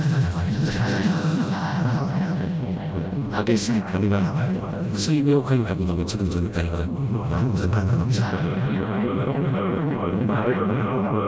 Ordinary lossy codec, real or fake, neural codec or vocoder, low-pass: none; fake; codec, 16 kHz, 0.5 kbps, FreqCodec, smaller model; none